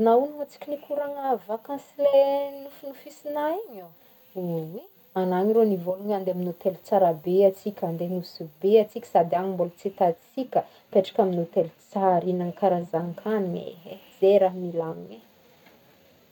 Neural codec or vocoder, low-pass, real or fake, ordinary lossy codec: none; 19.8 kHz; real; none